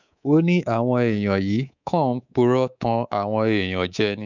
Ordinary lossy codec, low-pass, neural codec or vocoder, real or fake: none; 7.2 kHz; codec, 24 kHz, 3.1 kbps, DualCodec; fake